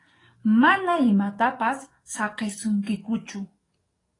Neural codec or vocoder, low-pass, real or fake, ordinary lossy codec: codec, 44.1 kHz, 7.8 kbps, DAC; 10.8 kHz; fake; AAC, 32 kbps